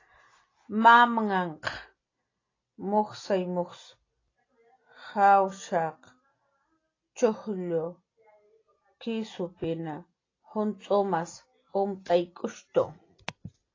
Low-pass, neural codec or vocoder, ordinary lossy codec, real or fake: 7.2 kHz; none; AAC, 32 kbps; real